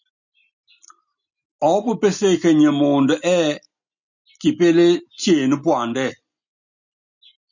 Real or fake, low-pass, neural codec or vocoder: real; 7.2 kHz; none